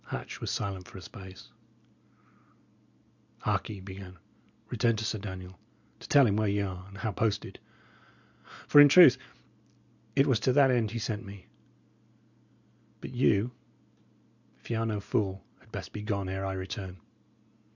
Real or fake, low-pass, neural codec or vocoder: real; 7.2 kHz; none